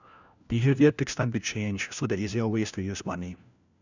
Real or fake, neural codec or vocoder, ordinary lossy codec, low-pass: fake; codec, 16 kHz, 1 kbps, FunCodec, trained on LibriTTS, 50 frames a second; none; 7.2 kHz